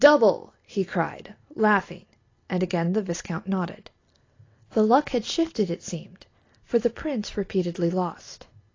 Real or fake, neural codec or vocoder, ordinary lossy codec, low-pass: real; none; AAC, 32 kbps; 7.2 kHz